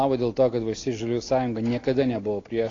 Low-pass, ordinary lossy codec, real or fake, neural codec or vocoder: 7.2 kHz; AAC, 32 kbps; real; none